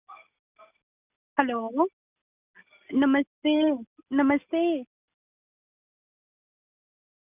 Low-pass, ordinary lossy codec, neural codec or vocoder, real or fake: 3.6 kHz; none; none; real